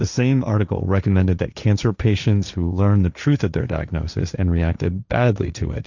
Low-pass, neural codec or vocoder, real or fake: 7.2 kHz; codec, 16 kHz, 1.1 kbps, Voila-Tokenizer; fake